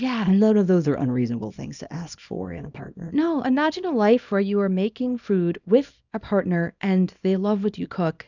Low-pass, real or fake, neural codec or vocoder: 7.2 kHz; fake; codec, 24 kHz, 0.9 kbps, WavTokenizer, small release